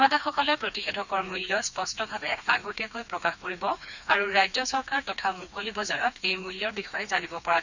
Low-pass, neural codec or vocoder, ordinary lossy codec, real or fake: 7.2 kHz; codec, 16 kHz, 2 kbps, FreqCodec, smaller model; none; fake